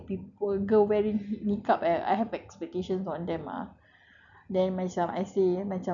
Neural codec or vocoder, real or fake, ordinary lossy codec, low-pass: none; real; none; 7.2 kHz